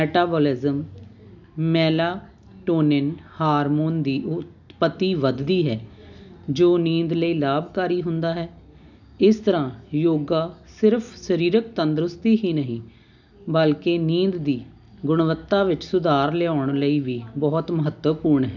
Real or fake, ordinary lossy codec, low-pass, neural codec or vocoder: real; none; 7.2 kHz; none